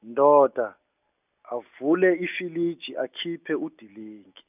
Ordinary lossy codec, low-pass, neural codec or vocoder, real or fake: none; 3.6 kHz; none; real